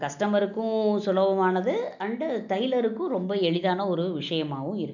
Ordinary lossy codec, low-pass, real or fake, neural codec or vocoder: none; 7.2 kHz; real; none